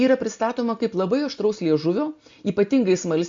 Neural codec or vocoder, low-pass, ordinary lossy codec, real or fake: none; 7.2 kHz; MP3, 48 kbps; real